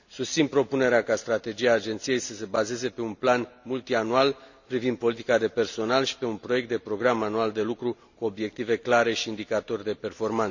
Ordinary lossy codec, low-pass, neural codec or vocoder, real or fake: none; 7.2 kHz; none; real